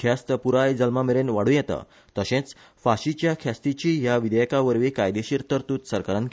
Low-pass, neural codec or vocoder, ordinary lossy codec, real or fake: none; none; none; real